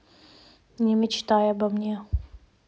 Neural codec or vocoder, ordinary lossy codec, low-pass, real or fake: none; none; none; real